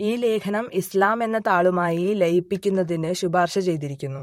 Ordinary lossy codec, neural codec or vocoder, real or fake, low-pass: MP3, 64 kbps; vocoder, 44.1 kHz, 128 mel bands, Pupu-Vocoder; fake; 19.8 kHz